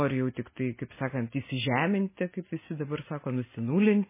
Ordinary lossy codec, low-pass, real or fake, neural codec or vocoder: MP3, 16 kbps; 3.6 kHz; real; none